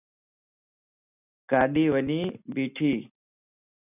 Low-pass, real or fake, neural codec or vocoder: 3.6 kHz; real; none